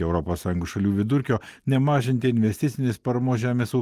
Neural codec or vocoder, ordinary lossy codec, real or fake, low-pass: none; Opus, 24 kbps; real; 14.4 kHz